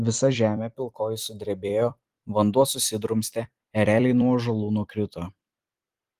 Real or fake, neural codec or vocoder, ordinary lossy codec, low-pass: fake; vocoder, 44.1 kHz, 128 mel bands every 256 samples, BigVGAN v2; Opus, 24 kbps; 14.4 kHz